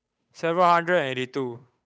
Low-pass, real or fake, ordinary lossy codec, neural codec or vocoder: none; fake; none; codec, 16 kHz, 8 kbps, FunCodec, trained on Chinese and English, 25 frames a second